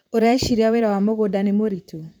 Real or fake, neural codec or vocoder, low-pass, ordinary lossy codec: fake; vocoder, 44.1 kHz, 128 mel bands, Pupu-Vocoder; none; none